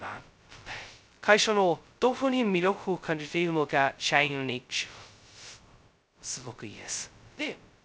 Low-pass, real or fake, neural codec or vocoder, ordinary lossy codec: none; fake; codec, 16 kHz, 0.2 kbps, FocalCodec; none